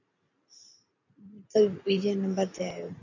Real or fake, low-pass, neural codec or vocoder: fake; 7.2 kHz; vocoder, 44.1 kHz, 128 mel bands every 512 samples, BigVGAN v2